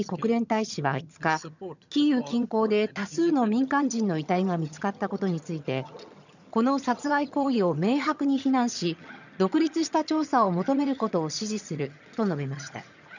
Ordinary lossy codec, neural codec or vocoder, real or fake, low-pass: none; vocoder, 22.05 kHz, 80 mel bands, HiFi-GAN; fake; 7.2 kHz